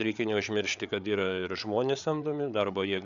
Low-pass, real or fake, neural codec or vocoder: 7.2 kHz; fake; codec, 16 kHz, 8 kbps, FreqCodec, larger model